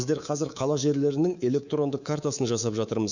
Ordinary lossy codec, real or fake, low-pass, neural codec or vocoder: none; fake; 7.2 kHz; codec, 24 kHz, 3.1 kbps, DualCodec